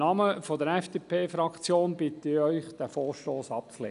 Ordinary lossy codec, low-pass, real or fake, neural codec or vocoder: none; 10.8 kHz; real; none